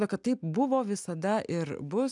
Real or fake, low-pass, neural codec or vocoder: real; 10.8 kHz; none